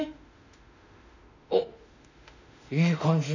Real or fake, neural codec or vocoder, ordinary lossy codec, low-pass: fake; autoencoder, 48 kHz, 32 numbers a frame, DAC-VAE, trained on Japanese speech; none; 7.2 kHz